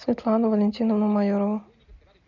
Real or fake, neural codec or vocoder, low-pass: real; none; 7.2 kHz